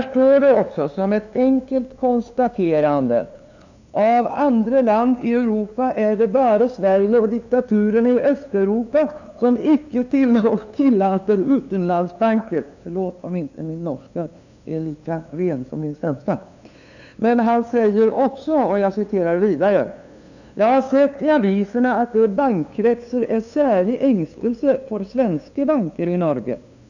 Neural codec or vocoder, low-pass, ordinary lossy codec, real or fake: codec, 16 kHz, 2 kbps, FunCodec, trained on LibriTTS, 25 frames a second; 7.2 kHz; none; fake